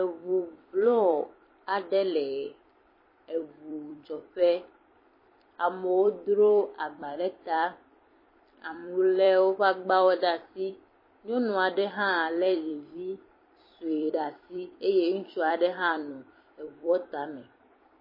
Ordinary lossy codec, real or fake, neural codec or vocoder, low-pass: MP3, 24 kbps; fake; vocoder, 44.1 kHz, 128 mel bands every 256 samples, BigVGAN v2; 5.4 kHz